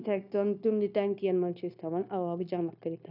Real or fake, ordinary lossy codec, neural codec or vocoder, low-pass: fake; none; codec, 16 kHz, 0.9 kbps, LongCat-Audio-Codec; 5.4 kHz